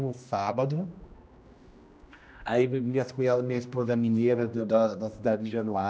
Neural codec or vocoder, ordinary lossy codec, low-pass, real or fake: codec, 16 kHz, 1 kbps, X-Codec, HuBERT features, trained on general audio; none; none; fake